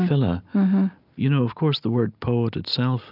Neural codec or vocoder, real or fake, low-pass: none; real; 5.4 kHz